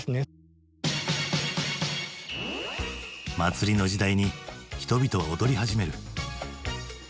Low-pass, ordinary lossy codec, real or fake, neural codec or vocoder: none; none; real; none